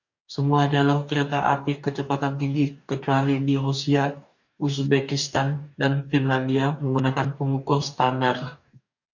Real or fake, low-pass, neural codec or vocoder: fake; 7.2 kHz; codec, 44.1 kHz, 2.6 kbps, DAC